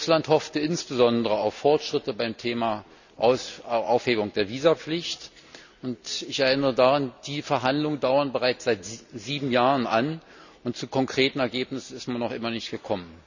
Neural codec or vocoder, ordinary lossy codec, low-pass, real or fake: none; none; 7.2 kHz; real